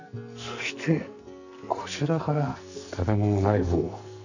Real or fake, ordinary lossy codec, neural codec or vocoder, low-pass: fake; none; codec, 44.1 kHz, 2.6 kbps, SNAC; 7.2 kHz